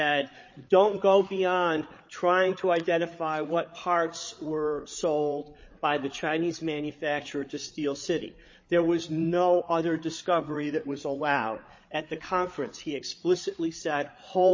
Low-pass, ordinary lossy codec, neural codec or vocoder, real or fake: 7.2 kHz; MP3, 32 kbps; codec, 16 kHz, 8 kbps, FreqCodec, larger model; fake